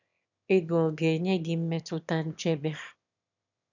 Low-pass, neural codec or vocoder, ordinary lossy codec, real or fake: 7.2 kHz; autoencoder, 22.05 kHz, a latent of 192 numbers a frame, VITS, trained on one speaker; none; fake